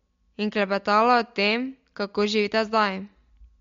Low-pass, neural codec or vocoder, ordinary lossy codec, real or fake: 7.2 kHz; none; MP3, 48 kbps; real